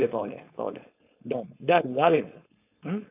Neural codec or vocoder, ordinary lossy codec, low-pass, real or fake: codec, 16 kHz, 4.8 kbps, FACodec; AAC, 32 kbps; 3.6 kHz; fake